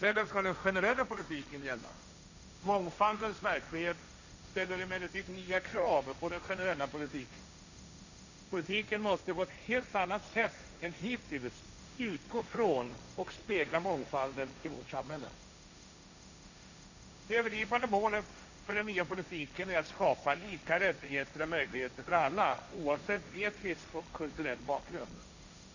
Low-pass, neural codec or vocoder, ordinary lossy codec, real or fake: 7.2 kHz; codec, 16 kHz, 1.1 kbps, Voila-Tokenizer; none; fake